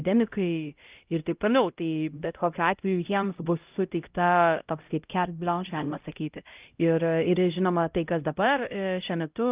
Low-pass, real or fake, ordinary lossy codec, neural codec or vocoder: 3.6 kHz; fake; Opus, 24 kbps; codec, 16 kHz, 0.5 kbps, X-Codec, HuBERT features, trained on LibriSpeech